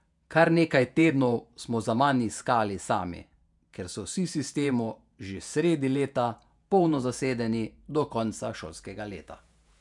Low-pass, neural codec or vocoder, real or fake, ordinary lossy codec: 10.8 kHz; vocoder, 48 kHz, 128 mel bands, Vocos; fake; none